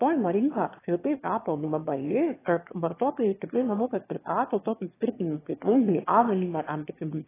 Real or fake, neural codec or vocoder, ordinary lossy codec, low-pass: fake; autoencoder, 22.05 kHz, a latent of 192 numbers a frame, VITS, trained on one speaker; AAC, 16 kbps; 3.6 kHz